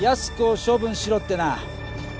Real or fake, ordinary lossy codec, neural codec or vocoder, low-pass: real; none; none; none